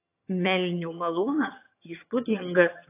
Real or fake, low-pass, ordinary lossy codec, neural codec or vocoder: fake; 3.6 kHz; AAC, 24 kbps; vocoder, 22.05 kHz, 80 mel bands, HiFi-GAN